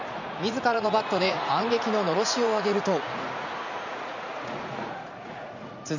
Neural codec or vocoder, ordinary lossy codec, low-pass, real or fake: vocoder, 44.1 kHz, 80 mel bands, Vocos; none; 7.2 kHz; fake